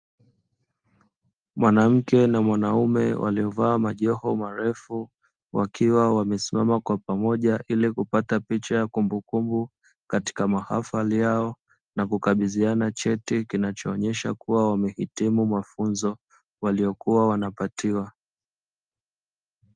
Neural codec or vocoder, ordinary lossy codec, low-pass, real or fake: none; Opus, 32 kbps; 9.9 kHz; real